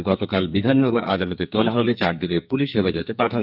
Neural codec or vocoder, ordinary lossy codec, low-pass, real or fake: codec, 44.1 kHz, 2.6 kbps, SNAC; none; 5.4 kHz; fake